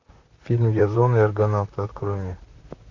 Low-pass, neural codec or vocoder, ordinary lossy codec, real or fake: 7.2 kHz; vocoder, 44.1 kHz, 128 mel bands, Pupu-Vocoder; AAC, 48 kbps; fake